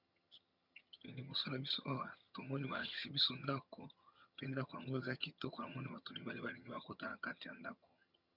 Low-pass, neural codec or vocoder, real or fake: 5.4 kHz; vocoder, 22.05 kHz, 80 mel bands, HiFi-GAN; fake